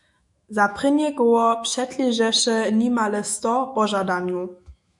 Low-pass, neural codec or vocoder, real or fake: 10.8 kHz; autoencoder, 48 kHz, 128 numbers a frame, DAC-VAE, trained on Japanese speech; fake